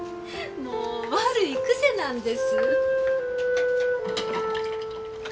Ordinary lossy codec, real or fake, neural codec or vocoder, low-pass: none; real; none; none